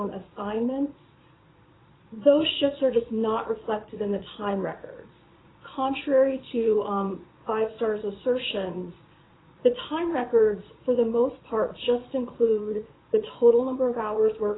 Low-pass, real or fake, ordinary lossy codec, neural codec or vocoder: 7.2 kHz; fake; AAC, 16 kbps; vocoder, 22.05 kHz, 80 mel bands, Vocos